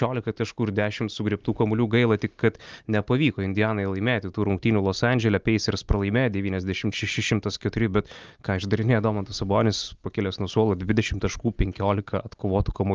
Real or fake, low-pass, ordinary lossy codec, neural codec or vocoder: real; 7.2 kHz; Opus, 24 kbps; none